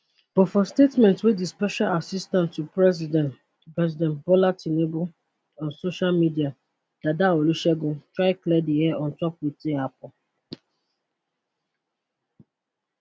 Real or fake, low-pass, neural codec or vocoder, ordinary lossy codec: real; none; none; none